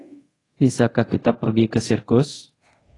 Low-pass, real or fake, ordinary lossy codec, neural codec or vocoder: 10.8 kHz; fake; AAC, 32 kbps; codec, 24 kHz, 0.5 kbps, DualCodec